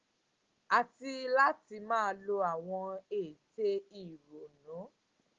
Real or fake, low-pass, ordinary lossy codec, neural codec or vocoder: real; 7.2 kHz; Opus, 16 kbps; none